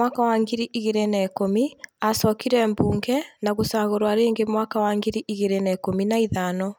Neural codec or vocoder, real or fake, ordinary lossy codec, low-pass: none; real; none; none